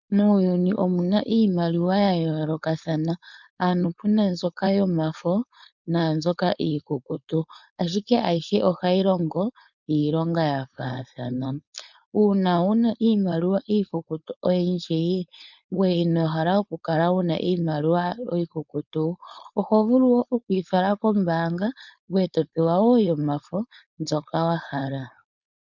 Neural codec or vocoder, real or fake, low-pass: codec, 16 kHz, 4.8 kbps, FACodec; fake; 7.2 kHz